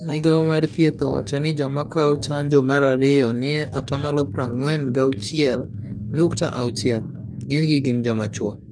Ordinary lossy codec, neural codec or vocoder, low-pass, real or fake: none; codec, 44.1 kHz, 2.6 kbps, DAC; 9.9 kHz; fake